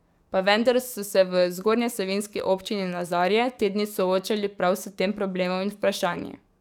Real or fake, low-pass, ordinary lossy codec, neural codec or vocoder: fake; 19.8 kHz; none; codec, 44.1 kHz, 7.8 kbps, DAC